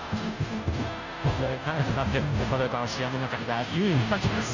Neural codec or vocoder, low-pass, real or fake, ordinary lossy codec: codec, 16 kHz, 0.5 kbps, FunCodec, trained on Chinese and English, 25 frames a second; 7.2 kHz; fake; none